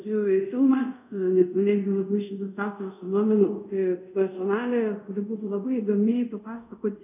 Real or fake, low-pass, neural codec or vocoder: fake; 3.6 kHz; codec, 24 kHz, 0.5 kbps, DualCodec